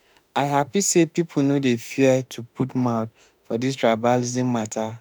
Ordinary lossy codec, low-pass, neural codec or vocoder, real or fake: none; none; autoencoder, 48 kHz, 32 numbers a frame, DAC-VAE, trained on Japanese speech; fake